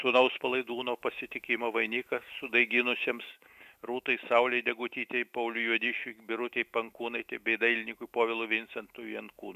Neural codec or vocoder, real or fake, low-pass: autoencoder, 48 kHz, 128 numbers a frame, DAC-VAE, trained on Japanese speech; fake; 14.4 kHz